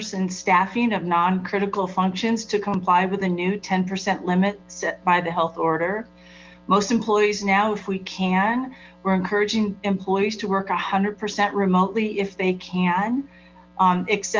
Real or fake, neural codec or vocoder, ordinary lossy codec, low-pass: real; none; Opus, 32 kbps; 7.2 kHz